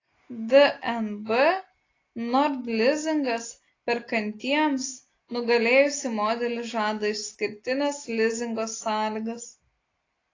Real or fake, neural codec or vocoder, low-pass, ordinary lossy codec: real; none; 7.2 kHz; AAC, 32 kbps